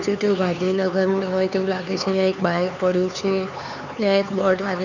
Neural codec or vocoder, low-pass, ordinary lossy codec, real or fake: codec, 16 kHz, 4 kbps, X-Codec, HuBERT features, trained on LibriSpeech; 7.2 kHz; none; fake